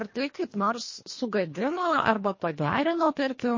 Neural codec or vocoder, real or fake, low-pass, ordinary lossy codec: codec, 24 kHz, 1.5 kbps, HILCodec; fake; 7.2 kHz; MP3, 32 kbps